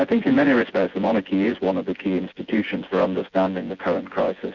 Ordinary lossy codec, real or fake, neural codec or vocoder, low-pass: Opus, 64 kbps; fake; vocoder, 24 kHz, 100 mel bands, Vocos; 7.2 kHz